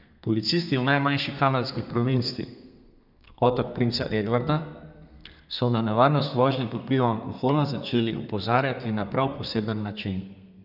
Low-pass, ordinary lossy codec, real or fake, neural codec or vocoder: 5.4 kHz; none; fake; codec, 32 kHz, 1.9 kbps, SNAC